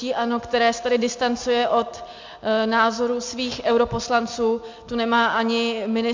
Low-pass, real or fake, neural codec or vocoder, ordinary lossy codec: 7.2 kHz; real; none; MP3, 48 kbps